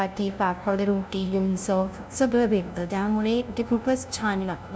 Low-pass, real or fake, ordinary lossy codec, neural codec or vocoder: none; fake; none; codec, 16 kHz, 0.5 kbps, FunCodec, trained on LibriTTS, 25 frames a second